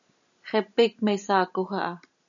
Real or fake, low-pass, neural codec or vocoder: real; 7.2 kHz; none